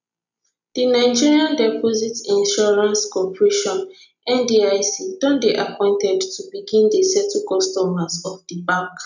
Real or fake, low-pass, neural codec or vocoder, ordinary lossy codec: real; 7.2 kHz; none; none